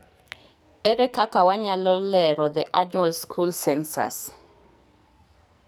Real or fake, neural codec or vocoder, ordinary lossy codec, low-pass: fake; codec, 44.1 kHz, 2.6 kbps, SNAC; none; none